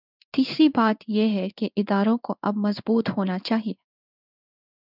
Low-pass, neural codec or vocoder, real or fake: 5.4 kHz; codec, 16 kHz in and 24 kHz out, 1 kbps, XY-Tokenizer; fake